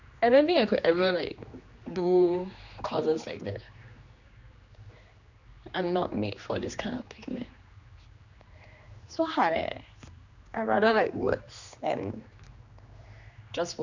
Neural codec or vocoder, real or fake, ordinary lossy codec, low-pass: codec, 16 kHz, 2 kbps, X-Codec, HuBERT features, trained on general audio; fake; Opus, 64 kbps; 7.2 kHz